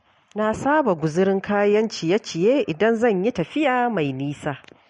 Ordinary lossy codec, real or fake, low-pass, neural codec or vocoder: MP3, 48 kbps; real; 19.8 kHz; none